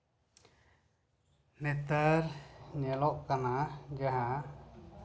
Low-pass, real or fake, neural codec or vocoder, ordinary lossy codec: none; real; none; none